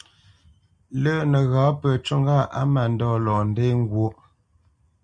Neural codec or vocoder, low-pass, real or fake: none; 9.9 kHz; real